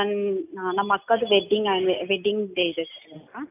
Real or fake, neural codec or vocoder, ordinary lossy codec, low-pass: real; none; none; 3.6 kHz